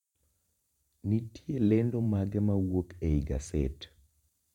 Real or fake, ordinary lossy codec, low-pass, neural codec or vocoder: fake; none; 19.8 kHz; vocoder, 44.1 kHz, 128 mel bands every 512 samples, BigVGAN v2